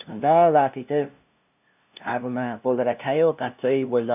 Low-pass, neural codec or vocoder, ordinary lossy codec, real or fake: 3.6 kHz; codec, 16 kHz, 0.5 kbps, FunCodec, trained on LibriTTS, 25 frames a second; none; fake